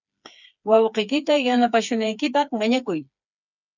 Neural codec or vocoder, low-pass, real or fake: codec, 16 kHz, 4 kbps, FreqCodec, smaller model; 7.2 kHz; fake